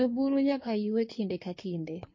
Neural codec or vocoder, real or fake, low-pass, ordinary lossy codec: codec, 16 kHz in and 24 kHz out, 1.1 kbps, FireRedTTS-2 codec; fake; 7.2 kHz; MP3, 32 kbps